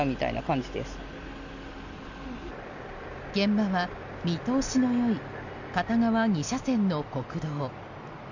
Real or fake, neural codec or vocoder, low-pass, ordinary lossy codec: real; none; 7.2 kHz; none